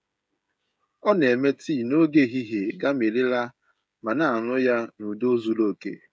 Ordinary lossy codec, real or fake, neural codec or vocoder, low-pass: none; fake; codec, 16 kHz, 16 kbps, FreqCodec, smaller model; none